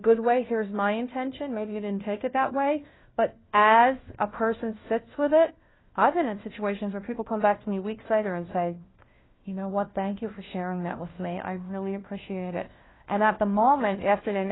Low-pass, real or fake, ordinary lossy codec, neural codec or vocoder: 7.2 kHz; fake; AAC, 16 kbps; codec, 16 kHz, 1 kbps, FunCodec, trained on LibriTTS, 50 frames a second